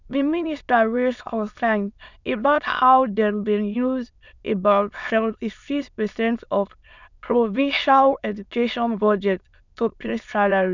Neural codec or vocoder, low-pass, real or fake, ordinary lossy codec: autoencoder, 22.05 kHz, a latent of 192 numbers a frame, VITS, trained on many speakers; 7.2 kHz; fake; none